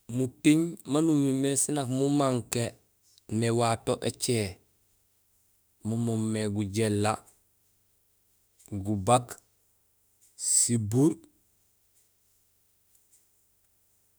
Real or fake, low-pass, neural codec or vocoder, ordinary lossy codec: fake; none; autoencoder, 48 kHz, 32 numbers a frame, DAC-VAE, trained on Japanese speech; none